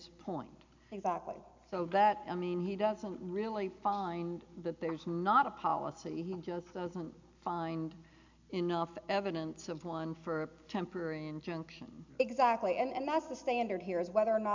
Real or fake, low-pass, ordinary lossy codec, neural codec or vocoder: real; 7.2 kHz; MP3, 64 kbps; none